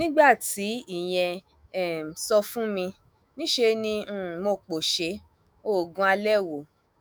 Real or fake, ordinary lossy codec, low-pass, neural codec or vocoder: fake; none; none; autoencoder, 48 kHz, 128 numbers a frame, DAC-VAE, trained on Japanese speech